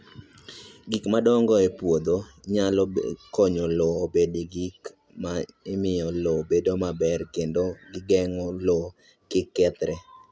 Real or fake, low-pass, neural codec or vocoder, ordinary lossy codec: real; none; none; none